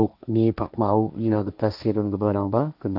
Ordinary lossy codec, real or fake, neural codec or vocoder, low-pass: none; fake; codec, 16 kHz, 1.1 kbps, Voila-Tokenizer; 5.4 kHz